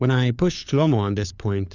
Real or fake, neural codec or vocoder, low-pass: fake; codec, 16 kHz, 4 kbps, FunCodec, trained on LibriTTS, 50 frames a second; 7.2 kHz